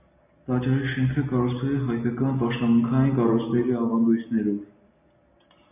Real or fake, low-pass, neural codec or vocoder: real; 3.6 kHz; none